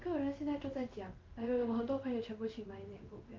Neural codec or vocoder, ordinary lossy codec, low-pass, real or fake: codec, 16 kHz in and 24 kHz out, 1 kbps, XY-Tokenizer; Opus, 24 kbps; 7.2 kHz; fake